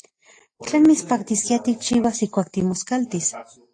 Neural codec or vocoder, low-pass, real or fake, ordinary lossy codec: none; 9.9 kHz; real; AAC, 48 kbps